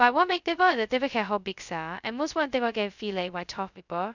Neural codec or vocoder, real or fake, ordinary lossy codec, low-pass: codec, 16 kHz, 0.2 kbps, FocalCodec; fake; none; 7.2 kHz